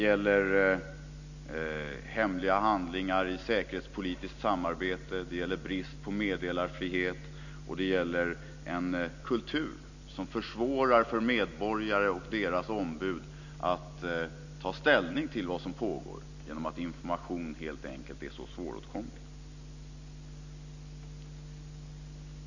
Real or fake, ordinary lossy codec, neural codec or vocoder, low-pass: real; none; none; 7.2 kHz